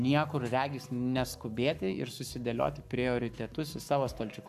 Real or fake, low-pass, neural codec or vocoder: fake; 14.4 kHz; codec, 44.1 kHz, 7.8 kbps, Pupu-Codec